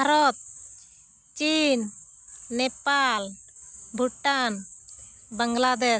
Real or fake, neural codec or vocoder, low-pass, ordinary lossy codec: real; none; none; none